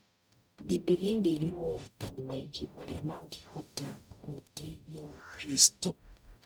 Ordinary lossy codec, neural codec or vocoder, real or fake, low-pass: none; codec, 44.1 kHz, 0.9 kbps, DAC; fake; none